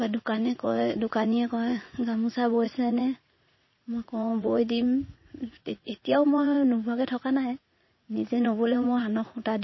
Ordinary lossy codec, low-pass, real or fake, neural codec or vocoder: MP3, 24 kbps; 7.2 kHz; fake; vocoder, 44.1 kHz, 80 mel bands, Vocos